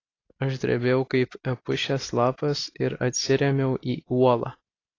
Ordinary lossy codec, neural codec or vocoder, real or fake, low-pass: AAC, 32 kbps; none; real; 7.2 kHz